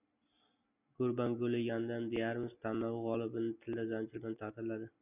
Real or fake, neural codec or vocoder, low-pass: real; none; 3.6 kHz